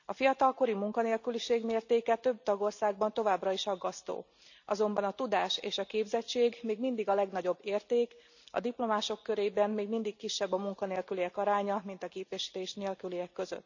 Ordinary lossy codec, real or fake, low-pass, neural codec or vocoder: none; real; 7.2 kHz; none